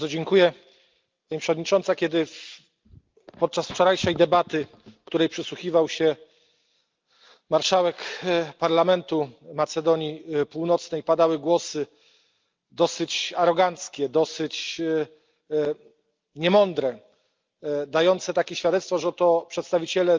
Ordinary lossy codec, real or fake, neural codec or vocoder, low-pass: Opus, 24 kbps; real; none; 7.2 kHz